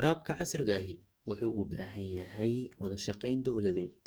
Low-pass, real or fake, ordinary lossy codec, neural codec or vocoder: none; fake; none; codec, 44.1 kHz, 2.6 kbps, DAC